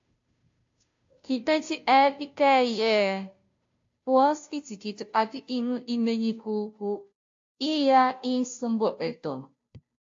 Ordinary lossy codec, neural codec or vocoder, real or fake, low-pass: AAC, 48 kbps; codec, 16 kHz, 0.5 kbps, FunCodec, trained on Chinese and English, 25 frames a second; fake; 7.2 kHz